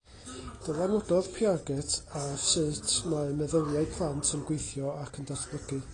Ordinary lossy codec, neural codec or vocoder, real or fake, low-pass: AAC, 32 kbps; none; real; 9.9 kHz